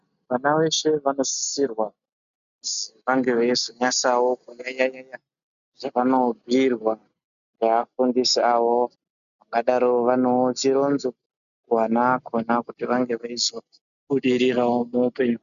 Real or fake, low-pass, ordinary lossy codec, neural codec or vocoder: real; 7.2 kHz; MP3, 96 kbps; none